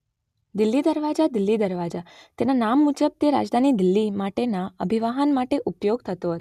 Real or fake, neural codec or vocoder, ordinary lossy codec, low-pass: real; none; none; 14.4 kHz